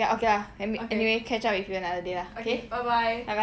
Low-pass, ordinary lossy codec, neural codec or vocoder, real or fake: none; none; none; real